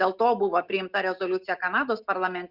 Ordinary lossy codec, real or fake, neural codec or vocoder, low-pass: Opus, 64 kbps; real; none; 5.4 kHz